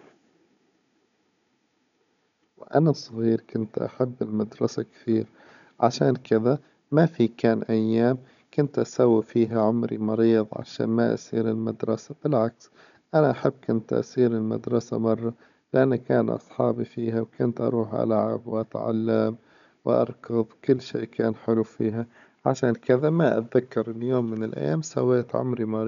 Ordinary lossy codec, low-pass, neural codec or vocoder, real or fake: none; 7.2 kHz; codec, 16 kHz, 16 kbps, FunCodec, trained on Chinese and English, 50 frames a second; fake